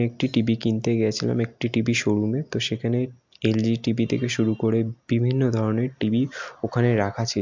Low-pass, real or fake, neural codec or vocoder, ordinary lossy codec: 7.2 kHz; real; none; MP3, 64 kbps